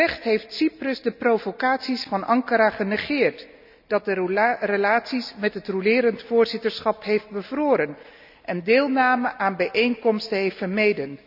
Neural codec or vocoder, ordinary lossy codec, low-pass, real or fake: none; none; 5.4 kHz; real